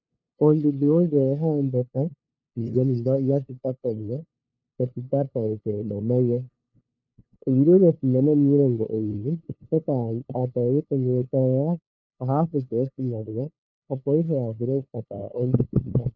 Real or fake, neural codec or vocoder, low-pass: fake; codec, 16 kHz, 2 kbps, FunCodec, trained on LibriTTS, 25 frames a second; 7.2 kHz